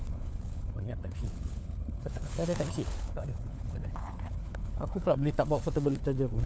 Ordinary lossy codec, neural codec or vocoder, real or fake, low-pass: none; codec, 16 kHz, 4 kbps, FunCodec, trained on LibriTTS, 50 frames a second; fake; none